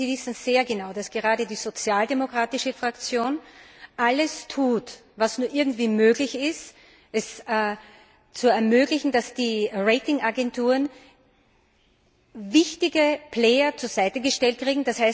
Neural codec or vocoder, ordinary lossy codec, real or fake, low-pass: none; none; real; none